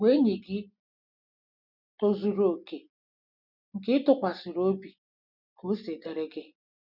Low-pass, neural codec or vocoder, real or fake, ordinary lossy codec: 5.4 kHz; none; real; none